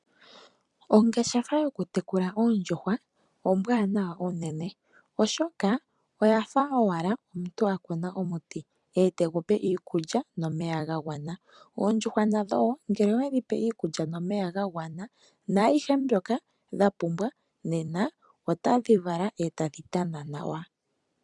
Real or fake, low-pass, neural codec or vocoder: fake; 10.8 kHz; vocoder, 44.1 kHz, 128 mel bands every 256 samples, BigVGAN v2